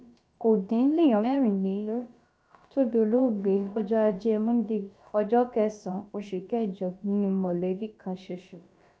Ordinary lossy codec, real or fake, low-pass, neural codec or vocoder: none; fake; none; codec, 16 kHz, about 1 kbps, DyCAST, with the encoder's durations